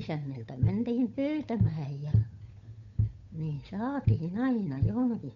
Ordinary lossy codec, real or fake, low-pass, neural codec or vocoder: MP3, 48 kbps; fake; 7.2 kHz; codec, 16 kHz, 8 kbps, FunCodec, trained on Chinese and English, 25 frames a second